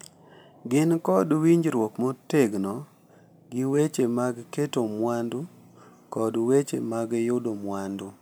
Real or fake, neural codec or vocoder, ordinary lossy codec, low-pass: real; none; none; none